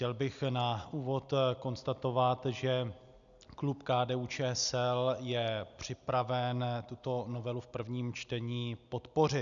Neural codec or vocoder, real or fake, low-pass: none; real; 7.2 kHz